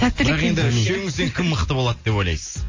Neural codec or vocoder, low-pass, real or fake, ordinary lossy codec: none; 7.2 kHz; real; MP3, 32 kbps